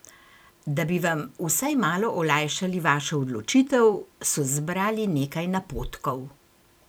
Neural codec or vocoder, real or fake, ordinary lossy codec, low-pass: vocoder, 44.1 kHz, 128 mel bands every 256 samples, BigVGAN v2; fake; none; none